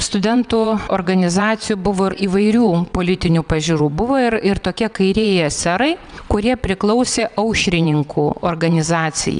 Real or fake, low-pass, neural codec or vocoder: fake; 9.9 kHz; vocoder, 22.05 kHz, 80 mel bands, WaveNeXt